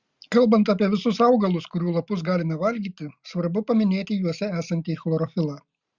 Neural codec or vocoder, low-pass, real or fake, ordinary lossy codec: none; 7.2 kHz; real; Opus, 64 kbps